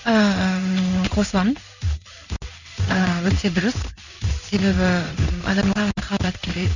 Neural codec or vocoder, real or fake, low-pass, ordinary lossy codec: codec, 16 kHz in and 24 kHz out, 1 kbps, XY-Tokenizer; fake; 7.2 kHz; none